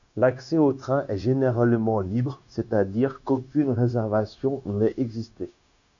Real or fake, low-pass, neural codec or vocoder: fake; 7.2 kHz; codec, 16 kHz, 0.9 kbps, LongCat-Audio-Codec